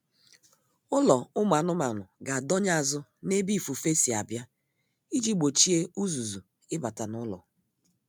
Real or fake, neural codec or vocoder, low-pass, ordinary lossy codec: real; none; none; none